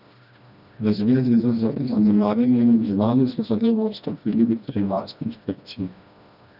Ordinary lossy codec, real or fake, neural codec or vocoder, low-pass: none; fake; codec, 16 kHz, 1 kbps, FreqCodec, smaller model; 5.4 kHz